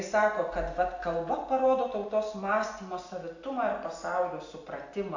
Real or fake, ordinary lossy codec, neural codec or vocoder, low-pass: real; AAC, 48 kbps; none; 7.2 kHz